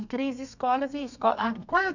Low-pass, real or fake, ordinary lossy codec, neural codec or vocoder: 7.2 kHz; fake; none; codec, 32 kHz, 1.9 kbps, SNAC